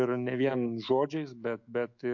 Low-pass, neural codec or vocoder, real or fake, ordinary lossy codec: 7.2 kHz; autoencoder, 48 kHz, 128 numbers a frame, DAC-VAE, trained on Japanese speech; fake; MP3, 48 kbps